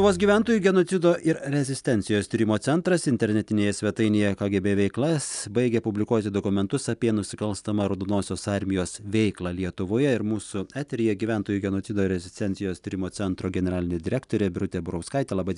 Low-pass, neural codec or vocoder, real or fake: 10.8 kHz; none; real